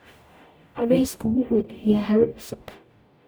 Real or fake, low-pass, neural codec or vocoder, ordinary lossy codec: fake; none; codec, 44.1 kHz, 0.9 kbps, DAC; none